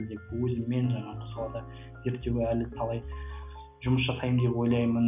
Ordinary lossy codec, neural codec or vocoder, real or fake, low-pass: none; none; real; 3.6 kHz